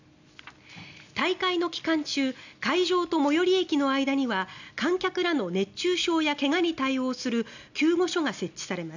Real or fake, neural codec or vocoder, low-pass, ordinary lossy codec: real; none; 7.2 kHz; none